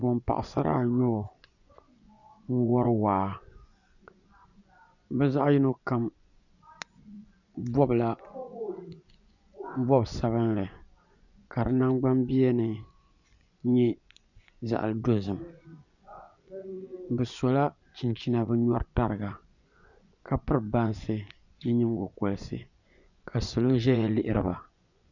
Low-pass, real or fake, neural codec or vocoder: 7.2 kHz; fake; vocoder, 24 kHz, 100 mel bands, Vocos